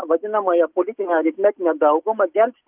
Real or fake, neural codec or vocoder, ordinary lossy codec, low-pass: real; none; Opus, 32 kbps; 3.6 kHz